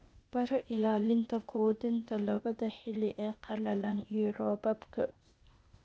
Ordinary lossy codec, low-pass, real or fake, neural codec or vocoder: none; none; fake; codec, 16 kHz, 0.8 kbps, ZipCodec